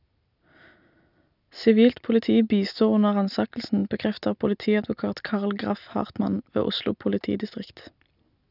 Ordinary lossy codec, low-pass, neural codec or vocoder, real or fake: none; 5.4 kHz; none; real